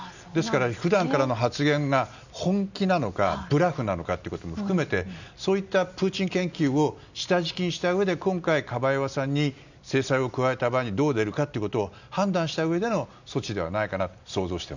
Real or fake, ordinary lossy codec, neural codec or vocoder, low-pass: real; none; none; 7.2 kHz